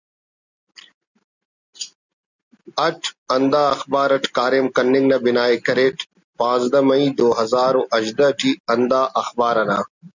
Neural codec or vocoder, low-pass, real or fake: none; 7.2 kHz; real